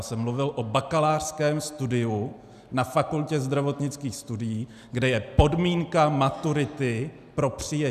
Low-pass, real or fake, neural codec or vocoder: 14.4 kHz; real; none